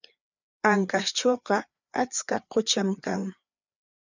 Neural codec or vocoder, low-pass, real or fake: codec, 16 kHz, 4 kbps, FreqCodec, larger model; 7.2 kHz; fake